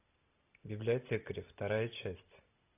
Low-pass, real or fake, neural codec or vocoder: 3.6 kHz; real; none